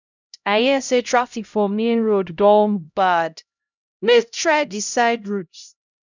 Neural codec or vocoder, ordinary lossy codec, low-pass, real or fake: codec, 16 kHz, 0.5 kbps, X-Codec, HuBERT features, trained on LibriSpeech; none; 7.2 kHz; fake